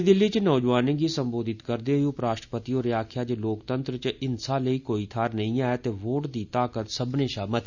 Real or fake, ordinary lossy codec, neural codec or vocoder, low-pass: real; none; none; 7.2 kHz